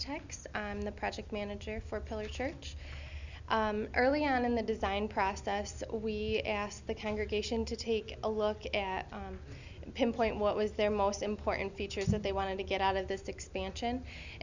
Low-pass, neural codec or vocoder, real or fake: 7.2 kHz; none; real